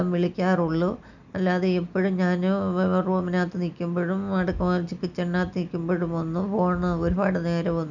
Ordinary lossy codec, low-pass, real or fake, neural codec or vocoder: none; 7.2 kHz; real; none